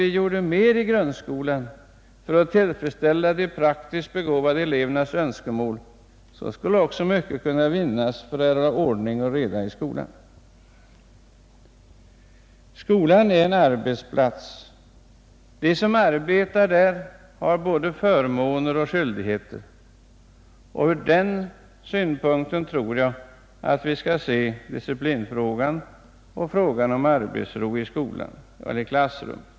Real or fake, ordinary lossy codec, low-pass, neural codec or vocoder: real; none; none; none